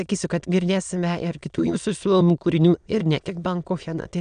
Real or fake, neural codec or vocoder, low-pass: fake; autoencoder, 22.05 kHz, a latent of 192 numbers a frame, VITS, trained on many speakers; 9.9 kHz